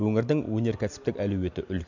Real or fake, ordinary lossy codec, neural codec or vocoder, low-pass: real; none; none; 7.2 kHz